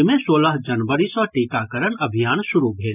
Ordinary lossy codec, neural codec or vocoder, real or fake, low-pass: none; none; real; 3.6 kHz